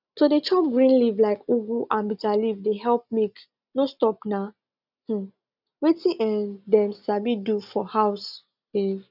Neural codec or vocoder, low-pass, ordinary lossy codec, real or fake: none; 5.4 kHz; none; real